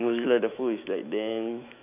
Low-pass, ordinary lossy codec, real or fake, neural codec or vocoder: 3.6 kHz; none; fake; autoencoder, 48 kHz, 128 numbers a frame, DAC-VAE, trained on Japanese speech